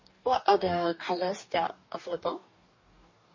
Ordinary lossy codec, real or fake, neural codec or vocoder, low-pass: MP3, 32 kbps; fake; codec, 44.1 kHz, 2.6 kbps, DAC; 7.2 kHz